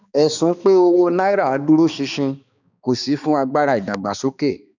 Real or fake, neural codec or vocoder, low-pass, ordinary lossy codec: fake; codec, 16 kHz, 2 kbps, X-Codec, HuBERT features, trained on balanced general audio; 7.2 kHz; none